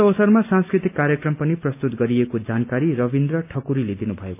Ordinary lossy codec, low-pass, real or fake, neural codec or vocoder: none; 3.6 kHz; real; none